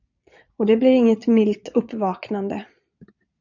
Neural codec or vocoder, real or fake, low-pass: vocoder, 22.05 kHz, 80 mel bands, Vocos; fake; 7.2 kHz